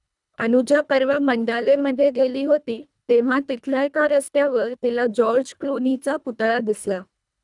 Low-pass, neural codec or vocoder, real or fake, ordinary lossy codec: 10.8 kHz; codec, 24 kHz, 1.5 kbps, HILCodec; fake; none